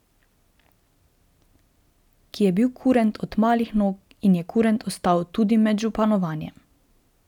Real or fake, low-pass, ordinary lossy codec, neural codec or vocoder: real; 19.8 kHz; none; none